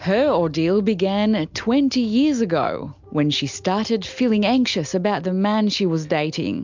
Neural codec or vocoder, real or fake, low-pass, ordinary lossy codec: none; real; 7.2 kHz; MP3, 64 kbps